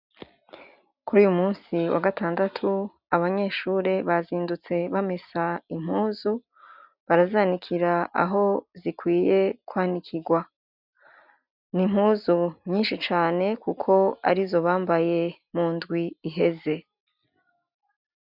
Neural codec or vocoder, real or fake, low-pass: none; real; 5.4 kHz